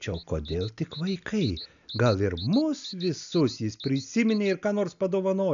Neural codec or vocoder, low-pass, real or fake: none; 7.2 kHz; real